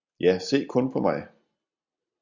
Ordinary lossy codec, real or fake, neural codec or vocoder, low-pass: Opus, 64 kbps; real; none; 7.2 kHz